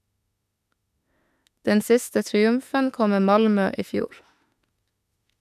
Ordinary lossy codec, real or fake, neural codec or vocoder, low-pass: none; fake; autoencoder, 48 kHz, 32 numbers a frame, DAC-VAE, trained on Japanese speech; 14.4 kHz